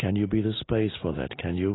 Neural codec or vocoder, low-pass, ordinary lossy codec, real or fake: none; 7.2 kHz; AAC, 16 kbps; real